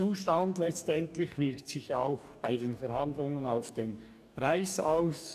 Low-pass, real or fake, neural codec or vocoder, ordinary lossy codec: 14.4 kHz; fake; codec, 44.1 kHz, 2.6 kbps, DAC; none